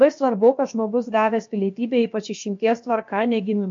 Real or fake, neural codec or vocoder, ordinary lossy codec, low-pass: fake; codec, 16 kHz, about 1 kbps, DyCAST, with the encoder's durations; MP3, 48 kbps; 7.2 kHz